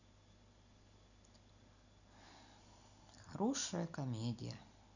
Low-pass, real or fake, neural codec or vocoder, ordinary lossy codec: 7.2 kHz; real; none; none